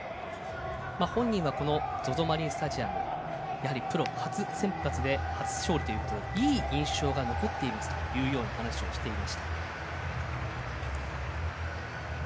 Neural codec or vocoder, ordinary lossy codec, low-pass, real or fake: none; none; none; real